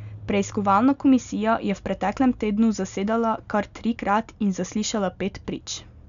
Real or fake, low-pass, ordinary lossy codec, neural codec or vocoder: real; 7.2 kHz; none; none